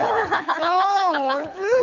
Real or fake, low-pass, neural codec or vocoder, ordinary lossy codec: fake; 7.2 kHz; codec, 24 kHz, 6 kbps, HILCodec; none